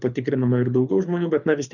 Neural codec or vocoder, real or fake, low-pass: codec, 24 kHz, 6 kbps, HILCodec; fake; 7.2 kHz